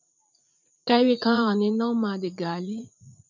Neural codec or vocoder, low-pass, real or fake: vocoder, 44.1 kHz, 80 mel bands, Vocos; 7.2 kHz; fake